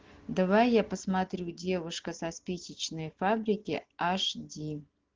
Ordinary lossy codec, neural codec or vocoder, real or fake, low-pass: Opus, 16 kbps; none; real; 7.2 kHz